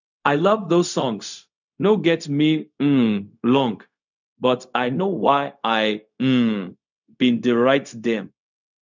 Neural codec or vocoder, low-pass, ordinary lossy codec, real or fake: codec, 16 kHz, 0.4 kbps, LongCat-Audio-Codec; 7.2 kHz; none; fake